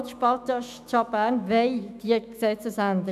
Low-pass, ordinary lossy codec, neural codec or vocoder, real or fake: 14.4 kHz; none; autoencoder, 48 kHz, 128 numbers a frame, DAC-VAE, trained on Japanese speech; fake